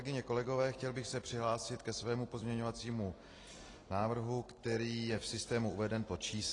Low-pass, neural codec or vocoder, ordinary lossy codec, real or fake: 10.8 kHz; none; AAC, 32 kbps; real